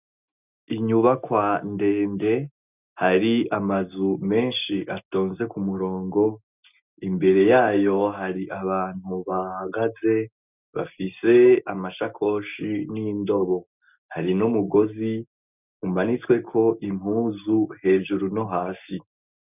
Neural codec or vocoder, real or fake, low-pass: none; real; 3.6 kHz